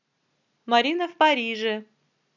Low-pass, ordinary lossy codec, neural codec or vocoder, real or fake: 7.2 kHz; none; none; real